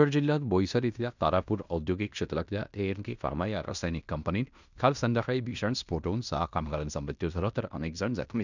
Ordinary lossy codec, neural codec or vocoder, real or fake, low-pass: none; codec, 16 kHz in and 24 kHz out, 0.9 kbps, LongCat-Audio-Codec, fine tuned four codebook decoder; fake; 7.2 kHz